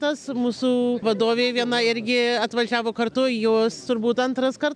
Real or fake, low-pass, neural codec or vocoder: real; 9.9 kHz; none